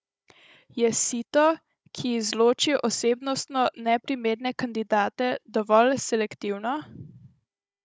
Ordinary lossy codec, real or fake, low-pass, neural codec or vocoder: none; fake; none; codec, 16 kHz, 16 kbps, FunCodec, trained on Chinese and English, 50 frames a second